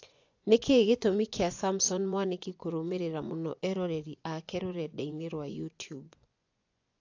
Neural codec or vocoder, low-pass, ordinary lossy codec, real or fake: vocoder, 24 kHz, 100 mel bands, Vocos; 7.2 kHz; AAC, 48 kbps; fake